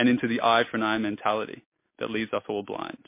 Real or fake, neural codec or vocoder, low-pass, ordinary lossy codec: real; none; 3.6 kHz; MP3, 24 kbps